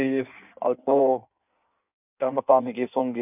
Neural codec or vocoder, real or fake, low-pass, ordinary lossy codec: codec, 16 kHz in and 24 kHz out, 1.1 kbps, FireRedTTS-2 codec; fake; 3.6 kHz; none